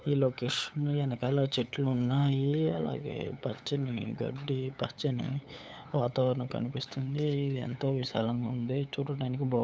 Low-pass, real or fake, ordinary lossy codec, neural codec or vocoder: none; fake; none; codec, 16 kHz, 8 kbps, FunCodec, trained on LibriTTS, 25 frames a second